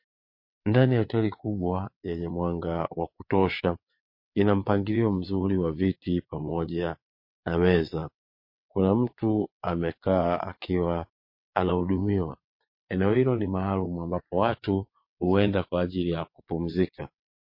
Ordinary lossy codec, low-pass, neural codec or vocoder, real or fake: MP3, 32 kbps; 5.4 kHz; vocoder, 22.05 kHz, 80 mel bands, WaveNeXt; fake